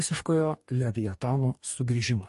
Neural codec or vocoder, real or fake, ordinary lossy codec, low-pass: codec, 44.1 kHz, 2.6 kbps, DAC; fake; MP3, 48 kbps; 14.4 kHz